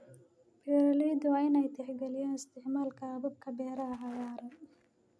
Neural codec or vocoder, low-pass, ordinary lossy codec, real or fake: none; none; none; real